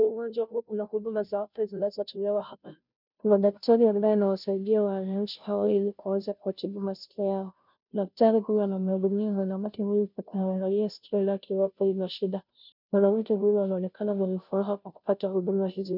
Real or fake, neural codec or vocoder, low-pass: fake; codec, 16 kHz, 0.5 kbps, FunCodec, trained on Chinese and English, 25 frames a second; 5.4 kHz